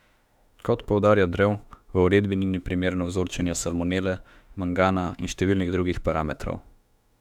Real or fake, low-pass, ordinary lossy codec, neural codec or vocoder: fake; 19.8 kHz; none; autoencoder, 48 kHz, 32 numbers a frame, DAC-VAE, trained on Japanese speech